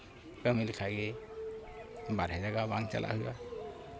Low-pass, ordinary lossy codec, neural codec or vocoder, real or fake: none; none; none; real